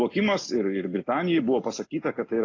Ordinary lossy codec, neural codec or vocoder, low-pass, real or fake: AAC, 32 kbps; none; 7.2 kHz; real